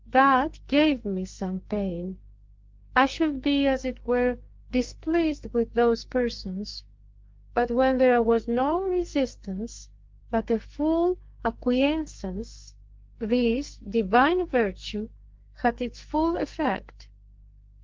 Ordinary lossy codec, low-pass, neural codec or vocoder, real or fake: Opus, 32 kbps; 7.2 kHz; codec, 44.1 kHz, 2.6 kbps, SNAC; fake